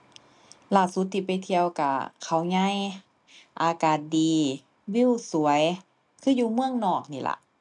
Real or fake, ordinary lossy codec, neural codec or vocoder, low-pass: real; none; none; 10.8 kHz